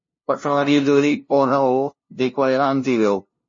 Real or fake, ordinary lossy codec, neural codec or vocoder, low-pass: fake; MP3, 32 kbps; codec, 16 kHz, 0.5 kbps, FunCodec, trained on LibriTTS, 25 frames a second; 7.2 kHz